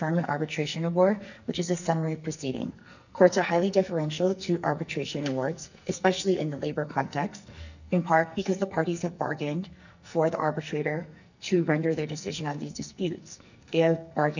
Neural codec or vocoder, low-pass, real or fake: codec, 44.1 kHz, 2.6 kbps, SNAC; 7.2 kHz; fake